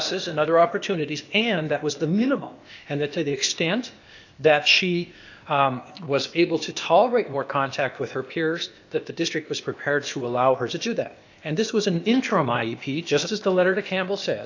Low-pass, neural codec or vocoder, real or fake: 7.2 kHz; codec, 16 kHz, 0.8 kbps, ZipCodec; fake